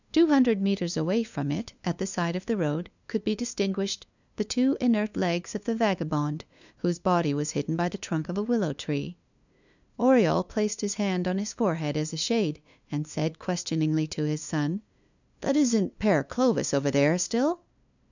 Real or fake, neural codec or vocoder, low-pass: fake; codec, 16 kHz, 2 kbps, FunCodec, trained on LibriTTS, 25 frames a second; 7.2 kHz